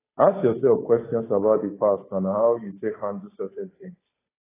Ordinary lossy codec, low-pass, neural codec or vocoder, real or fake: AAC, 16 kbps; 3.6 kHz; codec, 16 kHz, 8 kbps, FunCodec, trained on Chinese and English, 25 frames a second; fake